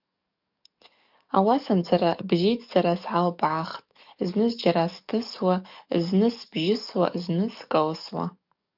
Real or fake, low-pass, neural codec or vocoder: fake; 5.4 kHz; codec, 44.1 kHz, 7.8 kbps, DAC